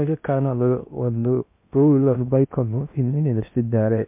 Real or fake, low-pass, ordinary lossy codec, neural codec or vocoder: fake; 3.6 kHz; MP3, 32 kbps; codec, 16 kHz in and 24 kHz out, 0.6 kbps, FocalCodec, streaming, 2048 codes